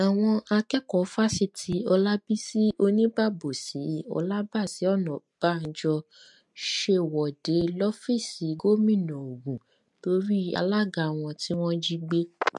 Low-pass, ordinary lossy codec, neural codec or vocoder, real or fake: 10.8 kHz; MP3, 64 kbps; none; real